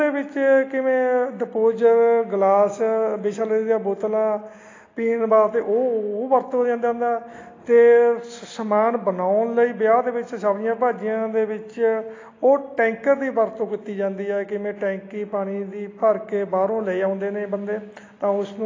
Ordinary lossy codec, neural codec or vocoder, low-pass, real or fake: AAC, 32 kbps; none; 7.2 kHz; real